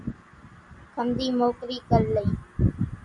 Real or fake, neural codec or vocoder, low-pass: real; none; 10.8 kHz